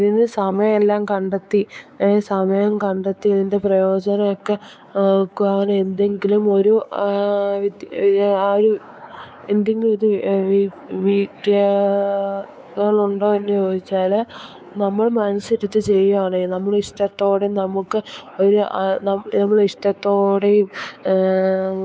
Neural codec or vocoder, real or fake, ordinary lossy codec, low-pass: codec, 16 kHz, 4 kbps, X-Codec, WavLM features, trained on Multilingual LibriSpeech; fake; none; none